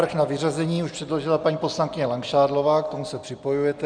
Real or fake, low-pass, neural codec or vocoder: real; 9.9 kHz; none